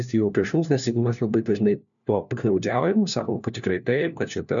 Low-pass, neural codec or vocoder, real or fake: 7.2 kHz; codec, 16 kHz, 1 kbps, FunCodec, trained on LibriTTS, 50 frames a second; fake